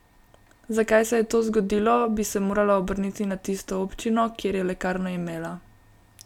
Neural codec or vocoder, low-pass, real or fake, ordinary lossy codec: none; 19.8 kHz; real; none